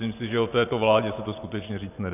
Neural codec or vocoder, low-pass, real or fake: vocoder, 24 kHz, 100 mel bands, Vocos; 3.6 kHz; fake